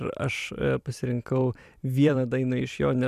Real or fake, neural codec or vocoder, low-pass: fake; vocoder, 44.1 kHz, 128 mel bands every 256 samples, BigVGAN v2; 14.4 kHz